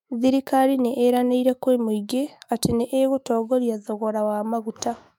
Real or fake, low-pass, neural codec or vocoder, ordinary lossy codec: fake; 19.8 kHz; autoencoder, 48 kHz, 128 numbers a frame, DAC-VAE, trained on Japanese speech; MP3, 96 kbps